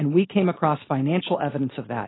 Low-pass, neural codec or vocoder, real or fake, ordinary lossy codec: 7.2 kHz; none; real; AAC, 16 kbps